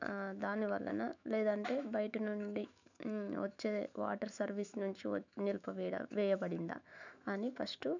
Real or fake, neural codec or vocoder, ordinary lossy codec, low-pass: fake; autoencoder, 48 kHz, 128 numbers a frame, DAC-VAE, trained on Japanese speech; none; 7.2 kHz